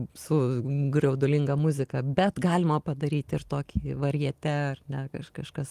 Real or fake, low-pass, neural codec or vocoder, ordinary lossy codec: real; 14.4 kHz; none; Opus, 24 kbps